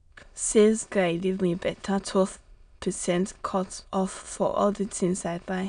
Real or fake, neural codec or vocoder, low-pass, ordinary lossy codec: fake; autoencoder, 22.05 kHz, a latent of 192 numbers a frame, VITS, trained on many speakers; 9.9 kHz; none